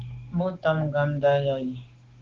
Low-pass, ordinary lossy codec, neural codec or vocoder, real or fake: 7.2 kHz; Opus, 16 kbps; codec, 16 kHz, 6 kbps, DAC; fake